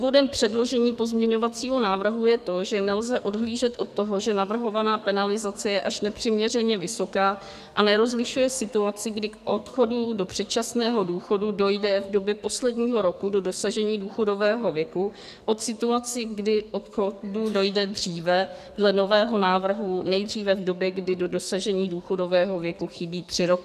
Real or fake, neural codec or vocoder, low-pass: fake; codec, 44.1 kHz, 2.6 kbps, SNAC; 14.4 kHz